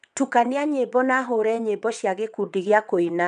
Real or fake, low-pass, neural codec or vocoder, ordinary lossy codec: fake; 9.9 kHz; vocoder, 22.05 kHz, 80 mel bands, WaveNeXt; none